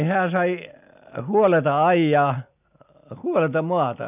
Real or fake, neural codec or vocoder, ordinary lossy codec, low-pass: real; none; none; 3.6 kHz